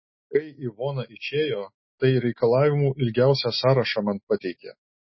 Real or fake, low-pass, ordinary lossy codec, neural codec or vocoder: real; 7.2 kHz; MP3, 24 kbps; none